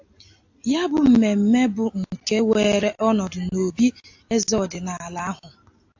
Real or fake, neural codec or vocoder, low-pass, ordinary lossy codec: real; none; 7.2 kHz; AAC, 48 kbps